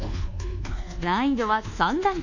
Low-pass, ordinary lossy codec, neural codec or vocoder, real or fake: 7.2 kHz; none; codec, 24 kHz, 1.2 kbps, DualCodec; fake